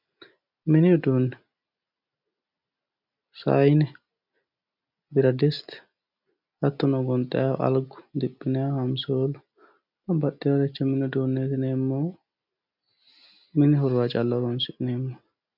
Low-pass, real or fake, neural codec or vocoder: 5.4 kHz; real; none